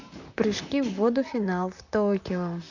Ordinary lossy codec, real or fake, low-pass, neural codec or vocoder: none; fake; 7.2 kHz; vocoder, 44.1 kHz, 128 mel bands, Pupu-Vocoder